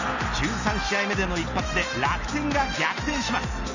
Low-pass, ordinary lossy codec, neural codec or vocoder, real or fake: 7.2 kHz; none; none; real